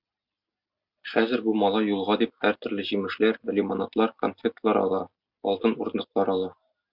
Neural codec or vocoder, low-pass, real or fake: none; 5.4 kHz; real